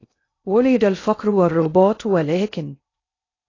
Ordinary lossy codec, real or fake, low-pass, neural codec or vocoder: AAC, 32 kbps; fake; 7.2 kHz; codec, 16 kHz in and 24 kHz out, 0.6 kbps, FocalCodec, streaming, 2048 codes